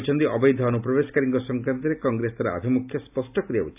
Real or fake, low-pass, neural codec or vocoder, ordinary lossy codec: real; 3.6 kHz; none; AAC, 32 kbps